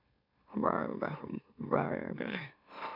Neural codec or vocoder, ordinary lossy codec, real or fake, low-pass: autoencoder, 44.1 kHz, a latent of 192 numbers a frame, MeloTTS; none; fake; 5.4 kHz